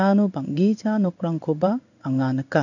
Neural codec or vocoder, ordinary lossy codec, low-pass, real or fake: codec, 16 kHz in and 24 kHz out, 1 kbps, XY-Tokenizer; none; 7.2 kHz; fake